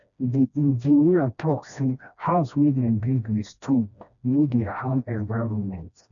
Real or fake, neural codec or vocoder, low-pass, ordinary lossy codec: fake; codec, 16 kHz, 1 kbps, FreqCodec, smaller model; 7.2 kHz; none